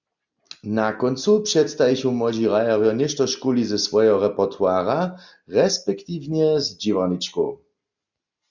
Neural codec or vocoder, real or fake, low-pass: none; real; 7.2 kHz